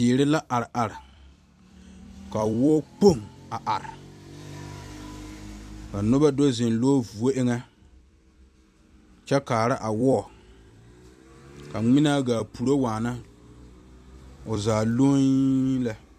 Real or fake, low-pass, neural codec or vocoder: fake; 14.4 kHz; vocoder, 44.1 kHz, 128 mel bands every 256 samples, BigVGAN v2